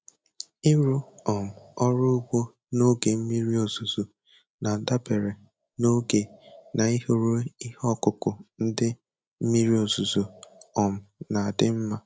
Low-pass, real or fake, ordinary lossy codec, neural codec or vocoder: none; real; none; none